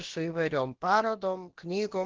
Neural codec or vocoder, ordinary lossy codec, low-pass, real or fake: codec, 16 kHz, about 1 kbps, DyCAST, with the encoder's durations; Opus, 16 kbps; 7.2 kHz; fake